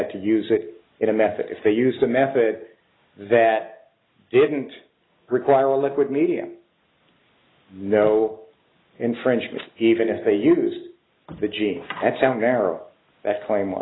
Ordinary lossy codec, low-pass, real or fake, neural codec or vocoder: AAC, 16 kbps; 7.2 kHz; real; none